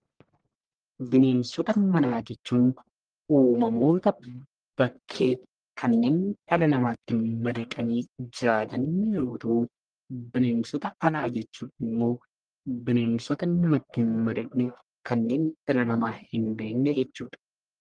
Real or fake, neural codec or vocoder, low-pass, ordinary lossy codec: fake; codec, 44.1 kHz, 1.7 kbps, Pupu-Codec; 9.9 kHz; Opus, 24 kbps